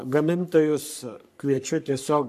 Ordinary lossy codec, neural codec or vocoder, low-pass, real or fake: AAC, 96 kbps; codec, 44.1 kHz, 3.4 kbps, Pupu-Codec; 14.4 kHz; fake